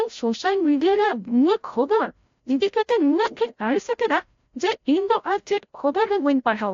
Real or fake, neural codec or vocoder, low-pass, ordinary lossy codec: fake; codec, 16 kHz, 0.5 kbps, FreqCodec, larger model; 7.2 kHz; AAC, 48 kbps